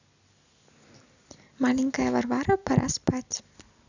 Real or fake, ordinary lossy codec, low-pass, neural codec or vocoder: real; none; 7.2 kHz; none